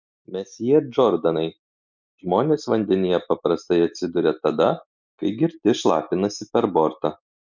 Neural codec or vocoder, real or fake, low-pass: none; real; 7.2 kHz